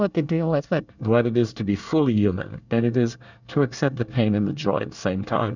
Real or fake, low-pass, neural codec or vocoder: fake; 7.2 kHz; codec, 24 kHz, 1 kbps, SNAC